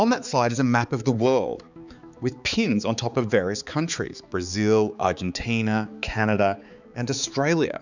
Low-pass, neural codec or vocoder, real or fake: 7.2 kHz; codec, 16 kHz, 4 kbps, X-Codec, HuBERT features, trained on balanced general audio; fake